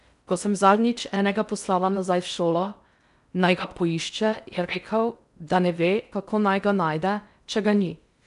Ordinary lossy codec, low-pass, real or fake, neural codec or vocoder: none; 10.8 kHz; fake; codec, 16 kHz in and 24 kHz out, 0.6 kbps, FocalCodec, streaming, 4096 codes